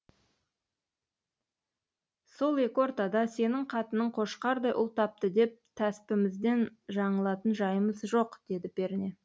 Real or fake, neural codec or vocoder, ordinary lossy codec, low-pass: real; none; none; none